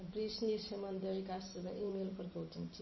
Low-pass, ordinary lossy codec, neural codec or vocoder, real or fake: 7.2 kHz; MP3, 24 kbps; vocoder, 44.1 kHz, 128 mel bands every 512 samples, BigVGAN v2; fake